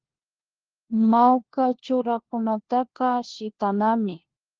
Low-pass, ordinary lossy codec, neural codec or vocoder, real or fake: 7.2 kHz; Opus, 16 kbps; codec, 16 kHz, 1 kbps, FunCodec, trained on LibriTTS, 50 frames a second; fake